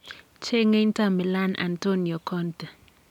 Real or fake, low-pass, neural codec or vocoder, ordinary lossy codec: real; 19.8 kHz; none; none